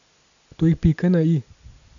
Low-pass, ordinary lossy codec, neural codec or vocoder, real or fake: 7.2 kHz; none; none; real